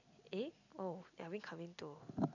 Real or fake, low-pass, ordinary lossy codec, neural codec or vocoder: real; 7.2 kHz; none; none